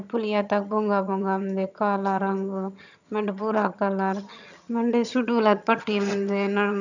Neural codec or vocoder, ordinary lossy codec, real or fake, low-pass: vocoder, 22.05 kHz, 80 mel bands, HiFi-GAN; none; fake; 7.2 kHz